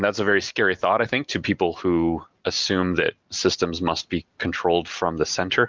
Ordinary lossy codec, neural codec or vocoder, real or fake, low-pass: Opus, 32 kbps; none; real; 7.2 kHz